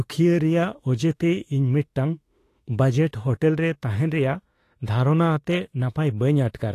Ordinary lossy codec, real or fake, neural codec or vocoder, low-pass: AAC, 48 kbps; fake; autoencoder, 48 kHz, 32 numbers a frame, DAC-VAE, trained on Japanese speech; 14.4 kHz